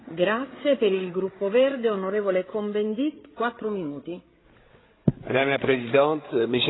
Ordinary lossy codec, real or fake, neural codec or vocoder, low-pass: AAC, 16 kbps; fake; codec, 16 kHz, 8 kbps, FreqCodec, larger model; 7.2 kHz